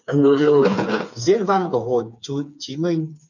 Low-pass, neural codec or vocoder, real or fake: 7.2 kHz; codec, 16 kHz, 4 kbps, FreqCodec, smaller model; fake